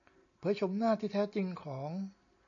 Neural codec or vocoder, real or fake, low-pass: none; real; 7.2 kHz